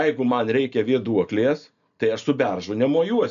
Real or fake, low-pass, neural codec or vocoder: real; 7.2 kHz; none